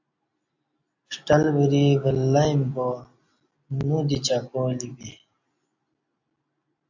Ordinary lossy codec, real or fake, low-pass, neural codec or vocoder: AAC, 48 kbps; real; 7.2 kHz; none